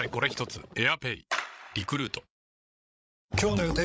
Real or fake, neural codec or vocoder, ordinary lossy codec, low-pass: fake; codec, 16 kHz, 16 kbps, FreqCodec, larger model; none; none